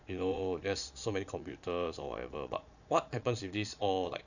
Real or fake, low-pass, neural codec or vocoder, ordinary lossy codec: fake; 7.2 kHz; vocoder, 22.05 kHz, 80 mel bands, Vocos; none